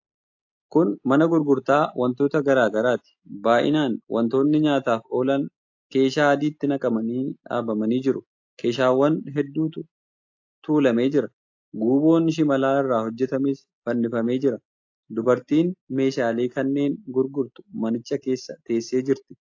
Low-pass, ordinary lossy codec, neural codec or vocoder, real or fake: 7.2 kHz; AAC, 48 kbps; none; real